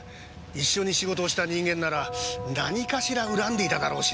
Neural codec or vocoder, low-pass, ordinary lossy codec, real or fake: none; none; none; real